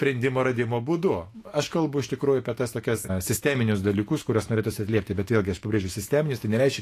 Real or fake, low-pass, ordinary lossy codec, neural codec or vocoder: fake; 14.4 kHz; AAC, 48 kbps; autoencoder, 48 kHz, 128 numbers a frame, DAC-VAE, trained on Japanese speech